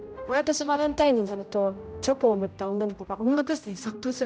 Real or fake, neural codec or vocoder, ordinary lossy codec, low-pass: fake; codec, 16 kHz, 0.5 kbps, X-Codec, HuBERT features, trained on general audio; none; none